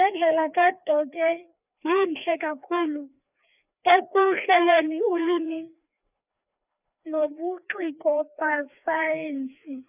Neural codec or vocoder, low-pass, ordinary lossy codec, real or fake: codec, 16 kHz, 2 kbps, FreqCodec, larger model; 3.6 kHz; none; fake